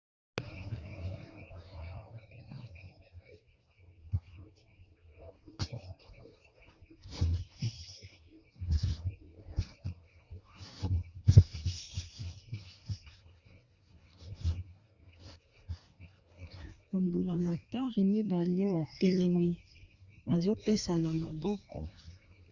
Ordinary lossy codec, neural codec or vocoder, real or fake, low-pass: Opus, 64 kbps; codec, 24 kHz, 1 kbps, SNAC; fake; 7.2 kHz